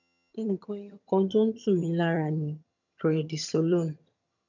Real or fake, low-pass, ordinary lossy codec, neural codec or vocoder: fake; 7.2 kHz; none; vocoder, 22.05 kHz, 80 mel bands, HiFi-GAN